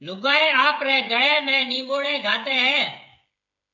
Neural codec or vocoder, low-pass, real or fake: codec, 16 kHz, 8 kbps, FreqCodec, smaller model; 7.2 kHz; fake